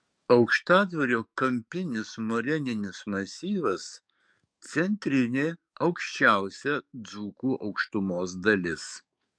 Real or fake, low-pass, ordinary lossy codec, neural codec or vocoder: fake; 9.9 kHz; MP3, 96 kbps; codec, 44.1 kHz, 7.8 kbps, DAC